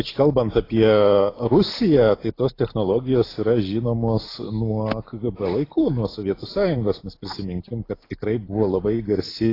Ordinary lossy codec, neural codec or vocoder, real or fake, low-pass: AAC, 24 kbps; none; real; 5.4 kHz